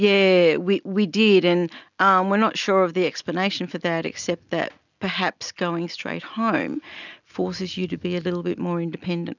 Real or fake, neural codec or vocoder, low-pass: real; none; 7.2 kHz